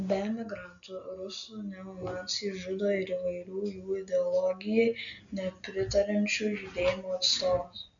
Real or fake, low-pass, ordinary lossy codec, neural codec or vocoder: real; 7.2 kHz; MP3, 96 kbps; none